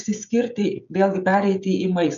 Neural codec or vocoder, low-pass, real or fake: codec, 16 kHz, 16 kbps, FreqCodec, smaller model; 7.2 kHz; fake